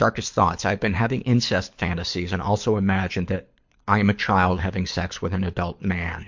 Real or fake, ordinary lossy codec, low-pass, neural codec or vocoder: fake; MP3, 48 kbps; 7.2 kHz; codec, 24 kHz, 6 kbps, HILCodec